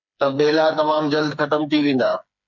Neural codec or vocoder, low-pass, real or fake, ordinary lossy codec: codec, 16 kHz, 4 kbps, FreqCodec, smaller model; 7.2 kHz; fake; MP3, 64 kbps